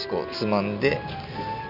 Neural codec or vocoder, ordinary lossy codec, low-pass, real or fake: none; none; 5.4 kHz; real